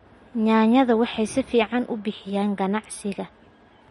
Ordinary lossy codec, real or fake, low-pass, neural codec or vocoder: MP3, 48 kbps; real; 19.8 kHz; none